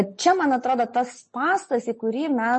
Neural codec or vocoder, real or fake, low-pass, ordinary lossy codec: none; real; 10.8 kHz; MP3, 32 kbps